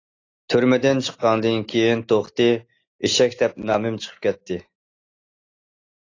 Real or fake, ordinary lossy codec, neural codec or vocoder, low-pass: real; AAC, 32 kbps; none; 7.2 kHz